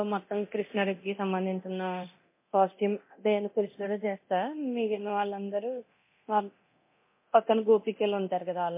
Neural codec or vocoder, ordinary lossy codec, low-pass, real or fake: codec, 24 kHz, 0.9 kbps, DualCodec; MP3, 24 kbps; 3.6 kHz; fake